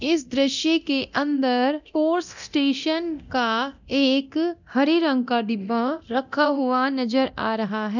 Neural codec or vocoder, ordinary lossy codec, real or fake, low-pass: codec, 24 kHz, 0.9 kbps, DualCodec; none; fake; 7.2 kHz